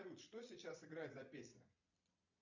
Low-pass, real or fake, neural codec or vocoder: 7.2 kHz; fake; vocoder, 22.05 kHz, 80 mel bands, Vocos